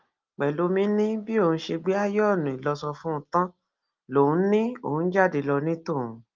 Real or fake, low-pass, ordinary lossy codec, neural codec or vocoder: real; 7.2 kHz; Opus, 32 kbps; none